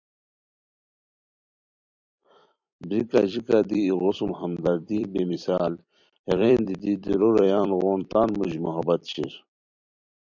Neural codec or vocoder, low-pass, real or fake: vocoder, 44.1 kHz, 128 mel bands every 256 samples, BigVGAN v2; 7.2 kHz; fake